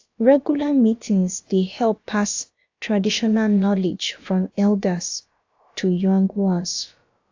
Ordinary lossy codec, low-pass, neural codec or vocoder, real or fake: AAC, 48 kbps; 7.2 kHz; codec, 16 kHz, about 1 kbps, DyCAST, with the encoder's durations; fake